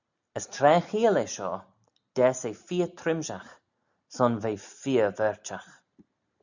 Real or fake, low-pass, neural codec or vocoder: real; 7.2 kHz; none